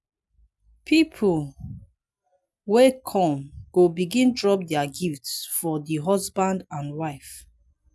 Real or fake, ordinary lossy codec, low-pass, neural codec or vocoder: real; none; none; none